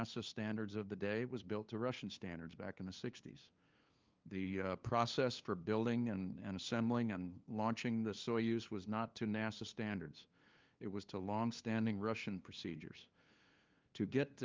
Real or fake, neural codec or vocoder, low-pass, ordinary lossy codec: fake; codec, 16 kHz, 2 kbps, FunCodec, trained on LibriTTS, 25 frames a second; 7.2 kHz; Opus, 24 kbps